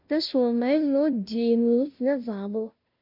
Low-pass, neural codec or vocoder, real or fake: 5.4 kHz; codec, 16 kHz, 0.5 kbps, FunCodec, trained on Chinese and English, 25 frames a second; fake